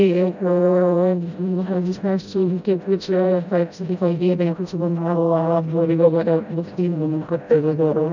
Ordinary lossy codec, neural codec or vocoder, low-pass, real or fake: none; codec, 16 kHz, 0.5 kbps, FreqCodec, smaller model; 7.2 kHz; fake